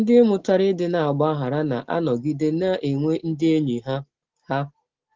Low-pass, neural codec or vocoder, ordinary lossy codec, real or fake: 7.2 kHz; none; Opus, 16 kbps; real